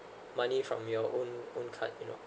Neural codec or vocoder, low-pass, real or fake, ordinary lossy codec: none; none; real; none